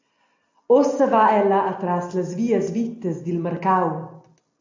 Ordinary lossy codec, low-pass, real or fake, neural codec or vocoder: AAC, 48 kbps; 7.2 kHz; real; none